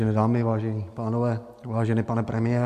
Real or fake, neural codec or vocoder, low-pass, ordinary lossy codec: real; none; 14.4 kHz; MP3, 64 kbps